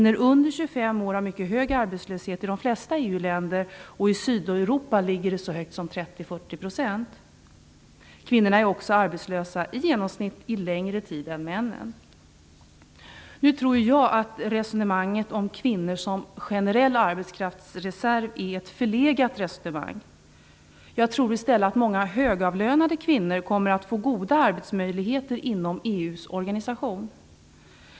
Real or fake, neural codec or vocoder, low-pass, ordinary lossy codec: real; none; none; none